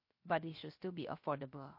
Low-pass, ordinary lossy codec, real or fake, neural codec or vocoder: 5.4 kHz; MP3, 32 kbps; fake; codec, 16 kHz, 0.7 kbps, FocalCodec